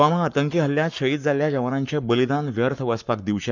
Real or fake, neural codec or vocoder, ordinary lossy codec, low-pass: fake; codec, 44.1 kHz, 7.8 kbps, Pupu-Codec; none; 7.2 kHz